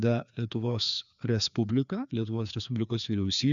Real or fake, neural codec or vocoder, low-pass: fake; codec, 16 kHz, 2 kbps, FreqCodec, larger model; 7.2 kHz